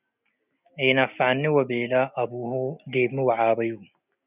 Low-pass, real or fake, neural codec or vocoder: 3.6 kHz; real; none